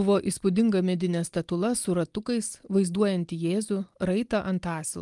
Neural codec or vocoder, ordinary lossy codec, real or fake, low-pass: none; Opus, 32 kbps; real; 10.8 kHz